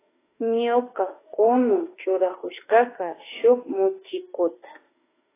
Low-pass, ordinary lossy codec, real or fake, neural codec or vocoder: 3.6 kHz; AAC, 16 kbps; fake; autoencoder, 48 kHz, 32 numbers a frame, DAC-VAE, trained on Japanese speech